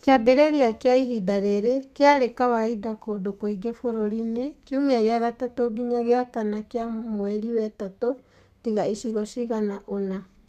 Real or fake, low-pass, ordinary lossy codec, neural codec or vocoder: fake; 14.4 kHz; none; codec, 32 kHz, 1.9 kbps, SNAC